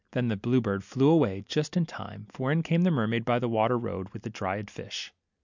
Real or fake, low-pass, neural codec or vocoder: real; 7.2 kHz; none